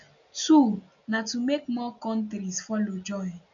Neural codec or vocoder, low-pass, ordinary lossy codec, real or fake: none; 7.2 kHz; none; real